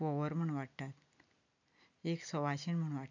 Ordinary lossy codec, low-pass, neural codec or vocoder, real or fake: none; 7.2 kHz; none; real